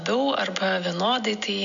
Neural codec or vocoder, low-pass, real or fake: none; 7.2 kHz; real